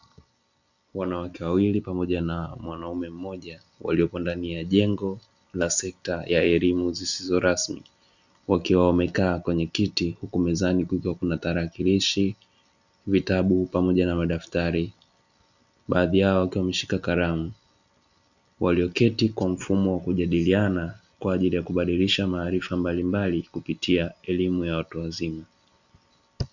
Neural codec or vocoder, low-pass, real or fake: none; 7.2 kHz; real